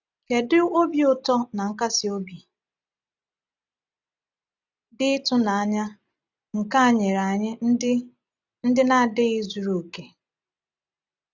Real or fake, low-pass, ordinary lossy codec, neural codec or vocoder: real; 7.2 kHz; none; none